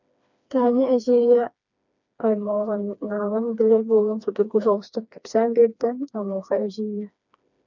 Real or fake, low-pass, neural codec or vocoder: fake; 7.2 kHz; codec, 16 kHz, 2 kbps, FreqCodec, smaller model